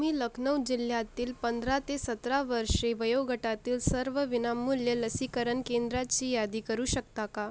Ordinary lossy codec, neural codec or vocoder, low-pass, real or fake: none; none; none; real